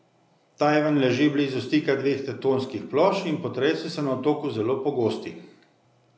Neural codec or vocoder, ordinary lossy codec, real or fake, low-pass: none; none; real; none